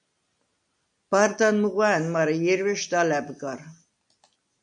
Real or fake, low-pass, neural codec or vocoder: real; 9.9 kHz; none